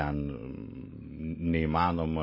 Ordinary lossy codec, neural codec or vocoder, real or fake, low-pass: MP3, 24 kbps; none; real; 5.4 kHz